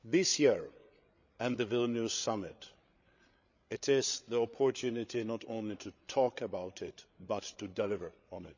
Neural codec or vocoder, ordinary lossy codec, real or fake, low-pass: codec, 16 kHz, 8 kbps, FreqCodec, larger model; none; fake; 7.2 kHz